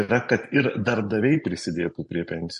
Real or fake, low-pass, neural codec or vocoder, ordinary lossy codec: real; 10.8 kHz; none; MP3, 48 kbps